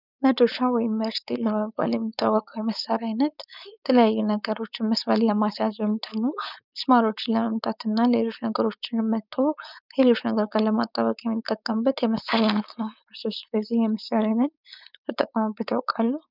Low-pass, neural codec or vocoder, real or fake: 5.4 kHz; codec, 16 kHz, 4.8 kbps, FACodec; fake